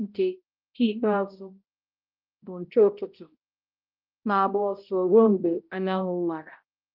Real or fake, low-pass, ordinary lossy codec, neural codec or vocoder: fake; 5.4 kHz; Opus, 24 kbps; codec, 16 kHz, 0.5 kbps, X-Codec, HuBERT features, trained on balanced general audio